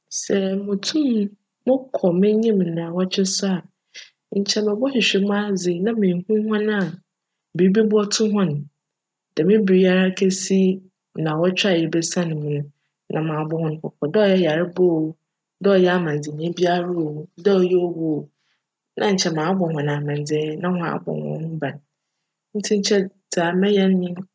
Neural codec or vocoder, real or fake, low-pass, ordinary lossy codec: none; real; none; none